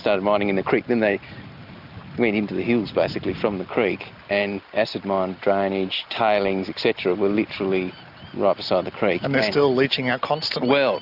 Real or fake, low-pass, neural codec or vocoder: real; 5.4 kHz; none